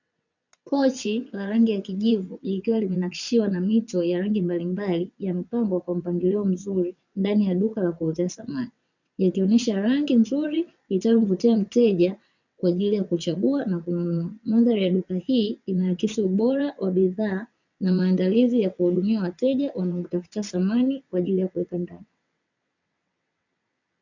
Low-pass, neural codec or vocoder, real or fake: 7.2 kHz; vocoder, 22.05 kHz, 80 mel bands, WaveNeXt; fake